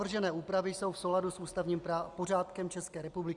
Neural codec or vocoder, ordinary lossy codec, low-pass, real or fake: none; Opus, 64 kbps; 10.8 kHz; real